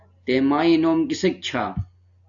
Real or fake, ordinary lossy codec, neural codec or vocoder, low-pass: real; AAC, 64 kbps; none; 7.2 kHz